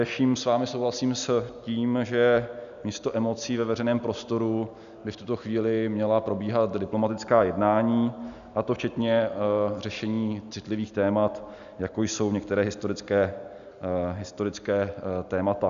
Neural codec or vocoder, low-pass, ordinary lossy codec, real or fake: none; 7.2 kHz; MP3, 96 kbps; real